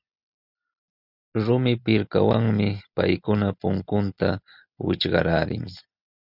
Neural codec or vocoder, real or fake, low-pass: none; real; 5.4 kHz